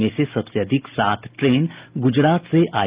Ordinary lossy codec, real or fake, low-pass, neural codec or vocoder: Opus, 32 kbps; real; 3.6 kHz; none